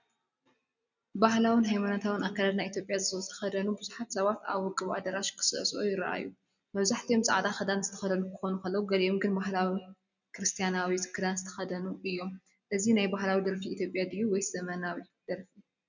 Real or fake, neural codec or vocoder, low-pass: real; none; 7.2 kHz